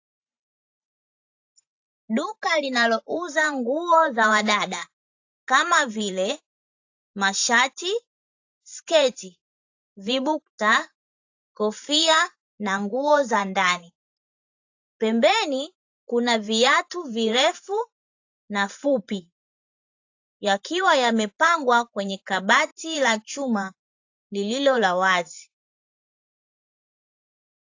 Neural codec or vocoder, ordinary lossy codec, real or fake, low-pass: vocoder, 44.1 kHz, 128 mel bands every 512 samples, BigVGAN v2; AAC, 48 kbps; fake; 7.2 kHz